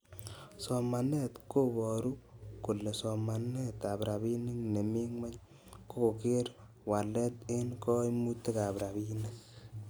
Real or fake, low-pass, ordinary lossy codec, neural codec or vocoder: real; none; none; none